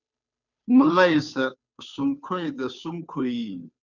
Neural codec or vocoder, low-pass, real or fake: codec, 16 kHz, 2 kbps, FunCodec, trained on Chinese and English, 25 frames a second; 7.2 kHz; fake